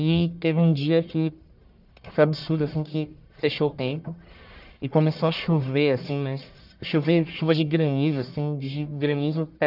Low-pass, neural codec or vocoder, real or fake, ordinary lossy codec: 5.4 kHz; codec, 44.1 kHz, 1.7 kbps, Pupu-Codec; fake; none